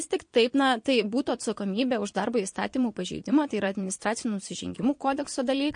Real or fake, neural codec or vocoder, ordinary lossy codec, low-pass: fake; vocoder, 22.05 kHz, 80 mel bands, Vocos; MP3, 48 kbps; 9.9 kHz